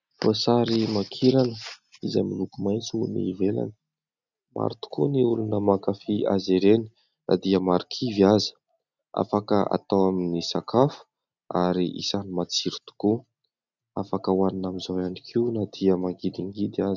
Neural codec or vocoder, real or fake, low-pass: none; real; 7.2 kHz